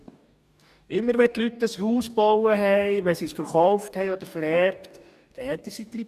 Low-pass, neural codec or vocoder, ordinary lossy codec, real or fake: 14.4 kHz; codec, 44.1 kHz, 2.6 kbps, DAC; none; fake